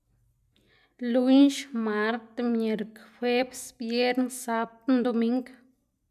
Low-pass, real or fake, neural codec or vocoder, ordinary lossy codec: 14.4 kHz; real; none; none